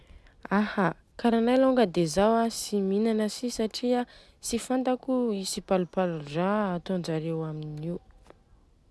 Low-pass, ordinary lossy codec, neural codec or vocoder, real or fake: none; none; none; real